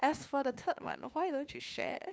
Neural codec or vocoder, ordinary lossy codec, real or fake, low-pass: codec, 16 kHz, 2 kbps, FunCodec, trained on LibriTTS, 25 frames a second; none; fake; none